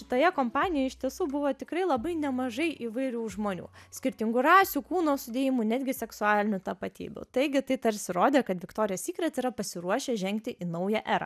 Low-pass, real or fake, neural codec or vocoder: 14.4 kHz; real; none